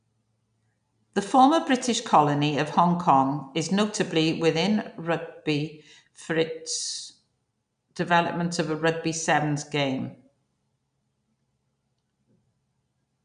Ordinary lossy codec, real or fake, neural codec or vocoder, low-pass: none; real; none; 9.9 kHz